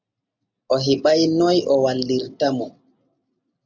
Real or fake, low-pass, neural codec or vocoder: real; 7.2 kHz; none